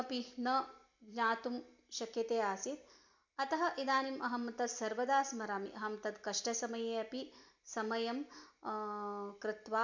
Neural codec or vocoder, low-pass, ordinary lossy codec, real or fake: none; 7.2 kHz; MP3, 64 kbps; real